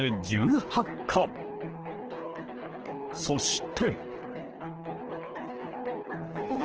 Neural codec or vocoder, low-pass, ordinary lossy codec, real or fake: codec, 24 kHz, 3 kbps, HILCodec; 7.2 kHz; Opus, 16 kbps; fake